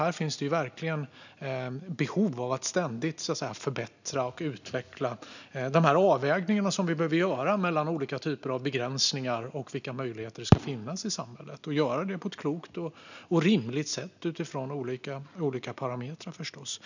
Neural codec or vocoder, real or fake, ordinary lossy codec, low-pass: none; real; none; 7.2 kHz